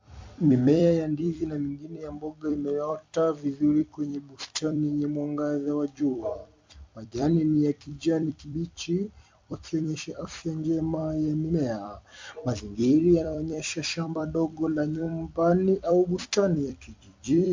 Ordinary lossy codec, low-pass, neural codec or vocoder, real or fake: MP3, 64 kbps; 7.2 kHz; vocoder, 44.1 kHz, 128 mel bands every 256 samples, BigVGAN v2; fake